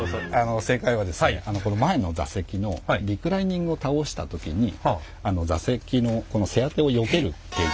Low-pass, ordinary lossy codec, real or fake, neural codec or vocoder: none; none; real; none